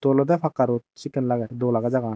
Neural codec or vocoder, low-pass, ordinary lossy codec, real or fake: none; none; none; real